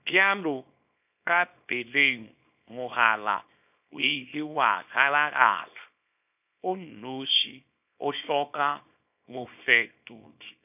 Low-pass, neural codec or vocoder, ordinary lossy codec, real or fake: 3.6 kHz; codec, 24 kHz, 0.9 kbps, WavTokenizer, small release; none; fake